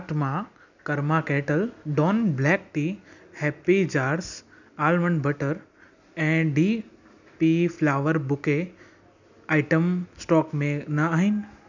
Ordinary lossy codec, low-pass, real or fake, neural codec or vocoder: none; 7.2 kHz; real; none